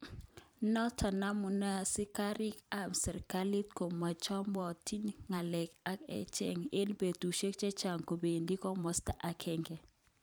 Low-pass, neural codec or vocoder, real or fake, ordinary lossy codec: none; vocoder, 44.1 kHz, 128 mel bands every 512 samples, BigVGAN v2; fake; none